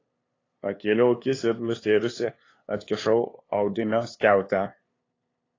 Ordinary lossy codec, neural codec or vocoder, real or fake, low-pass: AAC, 32 kbps; codec, 16 kHz, 2 kbps, FunCodec, trained on LibriTTS, 25 frames a second; fake; 7.2 kHz